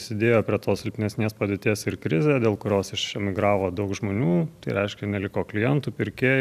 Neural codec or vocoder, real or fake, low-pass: none; real; 14.4 kHz